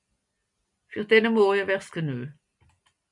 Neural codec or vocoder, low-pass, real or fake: none; 10.8 kHz; real